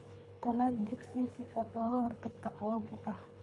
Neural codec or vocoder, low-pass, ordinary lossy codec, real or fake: codec, 24 kHz, 3 kbps, HILCodec; 10.8 kHz; MP3, 96 kbps; fake